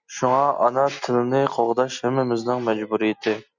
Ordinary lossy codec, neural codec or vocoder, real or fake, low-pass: Opus, 64 kbps; none; real; 7.2 kHz